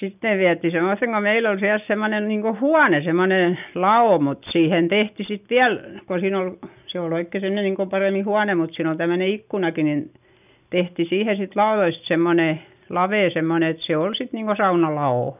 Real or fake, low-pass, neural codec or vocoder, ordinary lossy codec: real; 3.6 kHz; none; none